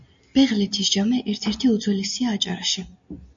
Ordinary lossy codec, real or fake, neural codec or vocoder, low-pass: MP3, 48 kbps; real; none; 7.2 kHz